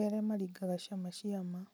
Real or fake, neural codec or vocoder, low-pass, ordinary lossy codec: real; none; none; none